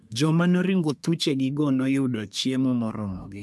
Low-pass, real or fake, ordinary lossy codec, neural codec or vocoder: none; fake; none; codec, 24 kHz, 1 kbps, SNAC